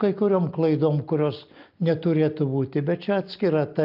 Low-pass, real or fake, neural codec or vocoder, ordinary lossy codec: 5.4 kHz; real; none; Opus, 24 kbps